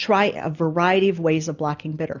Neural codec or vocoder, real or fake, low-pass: none; real; 7.2 kHz